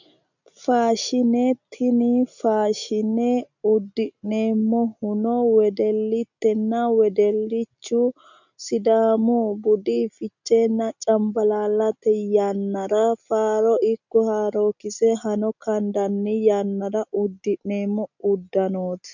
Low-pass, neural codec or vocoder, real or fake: 7.2 kHz; none; real